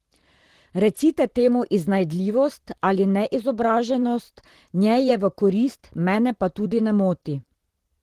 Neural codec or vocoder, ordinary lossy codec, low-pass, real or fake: vocoder, 44.1 kHz, 128 mel bands, Pupu-Vocoder; Opus, 16 kbps; 14.4 kHz; fake